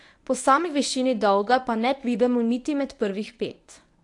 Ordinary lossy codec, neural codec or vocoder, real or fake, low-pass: AAC, 64 kbps; codec, 24 kHz, 0.9 kbps, WavTokenizer, medium speech release version 1; fake; 10.8 kHz